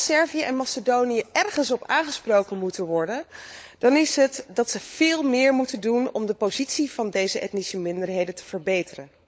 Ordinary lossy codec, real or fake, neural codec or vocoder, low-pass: none; fake; codec, 16 kHz, 16 kbps, FunCodec, trained on LibriTTS, 50 frames a second; none